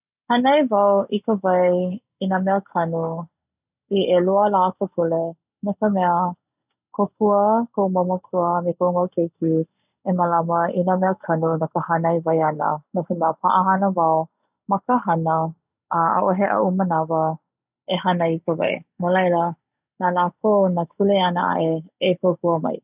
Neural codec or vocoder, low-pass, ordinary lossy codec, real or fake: none; 3.6 kHz; none; real